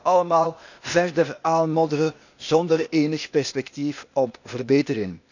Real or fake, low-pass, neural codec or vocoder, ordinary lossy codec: fake; 7.2 kHz; codec, 16 kHz, 0.8 kbps, ZipCodec; none